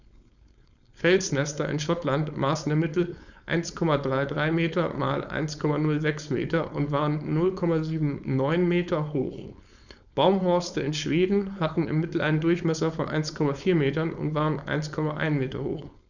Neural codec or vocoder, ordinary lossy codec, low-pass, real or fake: codec, 16 kHz, 4.8 kbps, FACodec; none; 7.2 kHz; fake